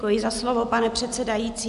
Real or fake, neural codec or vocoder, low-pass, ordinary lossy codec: real; none; 10.8 kHz; MP3, 64 kbps